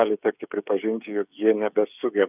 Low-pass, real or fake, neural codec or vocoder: 3.6 kHz; fake; codec, 16 kHz, 8 kbps, FreqCodec, smaller model